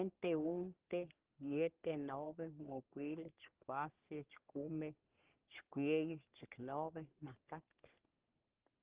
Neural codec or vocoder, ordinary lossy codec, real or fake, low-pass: codec, 44.1 kHz, 3.4 kbps, Pupu-Codec; Opus, 16 kbps; fake; 3.6 kHz